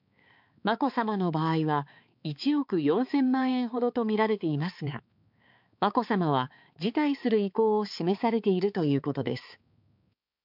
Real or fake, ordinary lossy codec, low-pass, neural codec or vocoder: fake; MP3, 48 kbps; 5.4 kHz; codec, 16 kHz, 4 kbps, X-Codec, HuBERT features, trained on balanced general audio